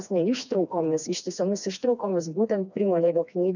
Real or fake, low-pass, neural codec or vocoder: fake; 7.2 kHz; codec, 16 kHz, 2 kbps, FreqCodec, smaller model